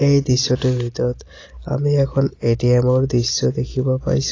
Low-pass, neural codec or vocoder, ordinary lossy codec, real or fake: 7.2 kHz; none; AAC, 32 kbps; real